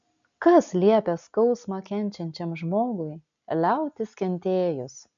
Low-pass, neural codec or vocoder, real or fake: 7.2 kHz; none; real